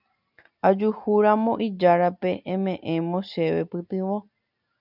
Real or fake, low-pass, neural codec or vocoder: real; 5.4 kHz; none